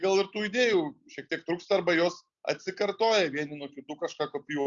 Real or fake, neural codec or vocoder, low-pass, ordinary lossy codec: real; none; 7.2 kHz; Opus, 64 kbps